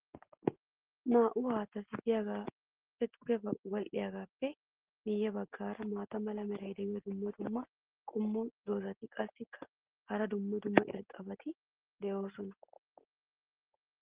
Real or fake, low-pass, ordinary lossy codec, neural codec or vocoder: real; 3.6 kHz; Opus, 16 kbps; none